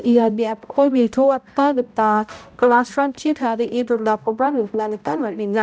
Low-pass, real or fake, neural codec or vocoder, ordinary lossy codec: none; fake; codec, 16 kHz, 0.5 kbps, X-Codec, HuBERT features, trained on balanced general audio; none